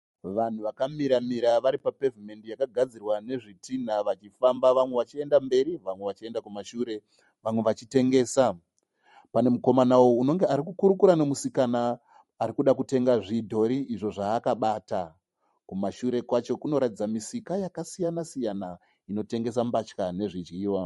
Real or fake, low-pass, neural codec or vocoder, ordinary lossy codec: fake; 19.8 kHz; vocoder, 44.1 kHz, 128 mel bands every 512 samples, BigVGAN v2; MP3, 48 kbps